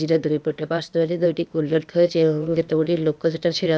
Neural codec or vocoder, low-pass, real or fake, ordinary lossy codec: codec, 16 kHz, 0.8 kbps, ZipCodec; none; fake; none